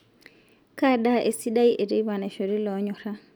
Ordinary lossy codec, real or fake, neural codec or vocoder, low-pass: none; real; none; none